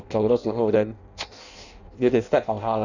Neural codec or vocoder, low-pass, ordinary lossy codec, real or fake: codec, 16 kHz in and 24 kHz out, 0.6 kbps, FireRedTTS-2 codec; 7.2 kHz; none; fake